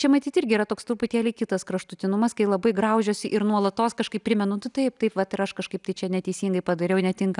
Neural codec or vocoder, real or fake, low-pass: none; real; 10.8 kHz